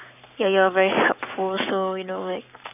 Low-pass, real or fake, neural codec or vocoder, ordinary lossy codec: 3.6 kHz; fake; codec, 44.1 kHz, 7.8 kbps, Pupu-Codec; none